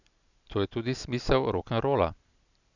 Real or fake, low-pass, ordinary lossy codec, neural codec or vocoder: real; 7.2 kHz; none; none